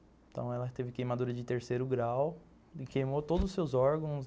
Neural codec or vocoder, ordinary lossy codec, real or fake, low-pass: none; none; real; none